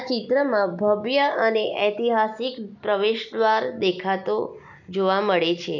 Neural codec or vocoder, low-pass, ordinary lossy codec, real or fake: none; 7.2 kHz; none; real